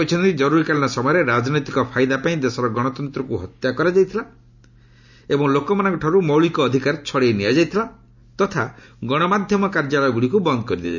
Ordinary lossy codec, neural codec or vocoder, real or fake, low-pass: none; none; real; 7.2 kHz